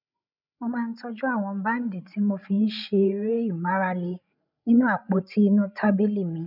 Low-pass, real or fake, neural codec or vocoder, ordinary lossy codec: 5.4 kHz; fake; codec, 16 kHz, 16 kbps, FreqCodec, larger model; none